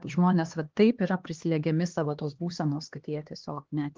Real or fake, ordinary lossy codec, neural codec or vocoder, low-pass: fake; Opus, 16 kbps; codec, 16 kHz, 2 kbps, X-Codec, HuBERT features, trained on LibriSpeech; 7.2 kHz